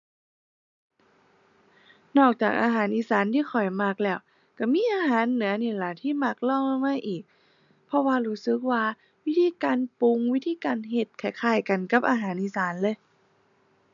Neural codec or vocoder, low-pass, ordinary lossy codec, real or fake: none; 7.2 kHz; none; real